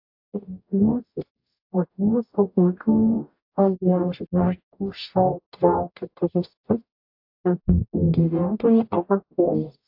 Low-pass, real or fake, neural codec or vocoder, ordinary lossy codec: 5.4 kHz; fake; codec, 44.1 kHz, 0.9 kbps, DAC; AAC, 48 kbps